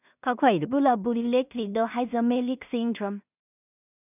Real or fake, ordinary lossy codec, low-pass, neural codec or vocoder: fake; none; 3.6 kHz; codec, 16 kHz in and 24 kHz out, 0.4 kbps, LongCat-Audio-Codec, two codebook decoder